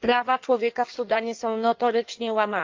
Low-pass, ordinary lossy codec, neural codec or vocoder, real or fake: 7.2 kHz; Opus, 32 kbps; codec, 16 kHz in and 24 kHz out, 1.1 kbps, FireRedTTS-2 codec; fake